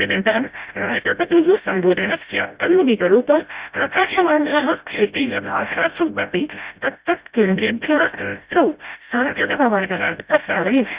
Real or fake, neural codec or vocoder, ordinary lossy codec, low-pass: fake; codec, 16 kHz, 0.5 kbps, FreqCodec, smaller model; Opus, 64 kbps; 3.6 kHz